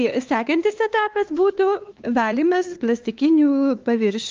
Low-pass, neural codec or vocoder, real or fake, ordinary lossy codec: 7.2 kHz; codec, 16 kHz, 2 kbps, X-Codec, HuBERT features, trained on LibriSpeech; fake; Opus, 32 kbps